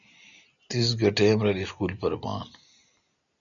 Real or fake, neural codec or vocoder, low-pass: real; none; 7.2 kHz